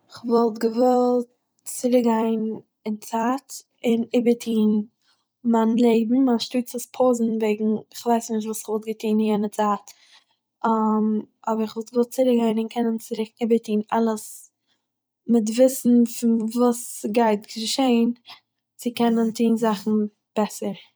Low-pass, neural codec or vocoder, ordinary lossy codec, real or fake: none; vocoder, 44.1 kHz, 128 mel bands every 256 samples, BigVGAN v2; none; fake